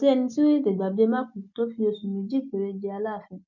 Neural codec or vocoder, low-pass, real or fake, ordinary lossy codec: none; 7.2 kHz; real; none